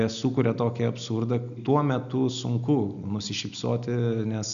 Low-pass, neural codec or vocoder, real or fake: 7.2 kHz; none; real